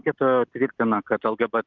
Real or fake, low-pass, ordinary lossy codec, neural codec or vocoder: real; 7.2 kHz; Opus, 24 kbps; none